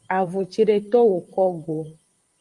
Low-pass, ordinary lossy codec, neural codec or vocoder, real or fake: 10.8 kHz; Opus, 32 kbps; vocoder, 44.1 kHz, 128 mel bands, Pupu-Vocoder; fake